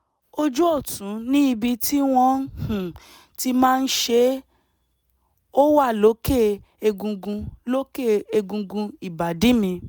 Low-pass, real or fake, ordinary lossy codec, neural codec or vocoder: none; real; none; none